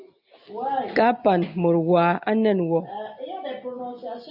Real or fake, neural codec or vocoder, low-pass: real; none; 5.4 kHz